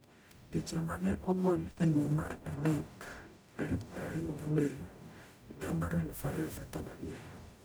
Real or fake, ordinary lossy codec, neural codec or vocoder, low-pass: fake; none; codec, 44.1 kHz, 0.9 kbps, DAC; none